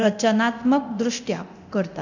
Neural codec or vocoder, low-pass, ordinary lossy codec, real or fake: codec, 24 kHz, 0.9 kbps, DualCodec; 7.2 kHz; none; fake